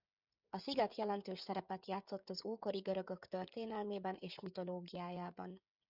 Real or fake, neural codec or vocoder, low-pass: fake; codec, 16 kHz, 16 kbps, FreqCodec, smaller model; 5.4 kHz